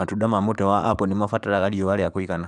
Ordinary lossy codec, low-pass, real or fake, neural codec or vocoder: none; 10.8 kHz; fake; codec, 44.1 kHz, 7.8 kbps, DAC